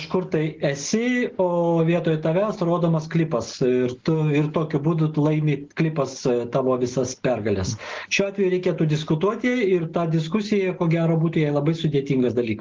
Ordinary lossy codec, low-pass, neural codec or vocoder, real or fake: Opus, 16 kbps; 7.2 kHz; none; real